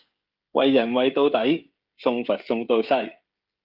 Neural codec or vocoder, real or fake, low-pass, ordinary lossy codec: codec, 16 kHz, 16 kbps, FreqCodec, smaller model; fake; 5.4 kHz; Opus, 24 kbps